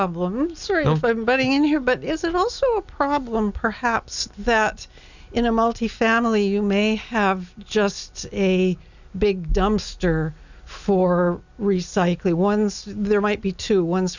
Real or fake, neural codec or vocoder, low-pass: real; none; 7.2 kHz